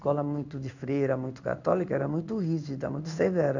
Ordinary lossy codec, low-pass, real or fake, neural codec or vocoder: none; 7.2 kHz; fake; codec, 16 kHz in and 24 kHz out, 1 kbps, XY-Tokenizer